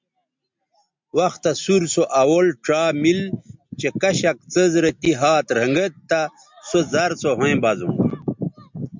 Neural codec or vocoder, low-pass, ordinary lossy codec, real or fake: none; 7.2 kHz; MP3, 64 kbps; real